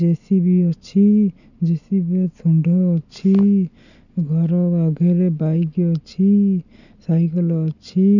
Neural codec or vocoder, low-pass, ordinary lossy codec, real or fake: none; 7.2 kHz; none; real